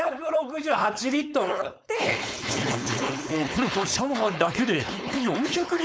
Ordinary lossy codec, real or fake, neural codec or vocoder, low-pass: none; fake; codec, 16 kHz, 4.8 kbps, FACodec; none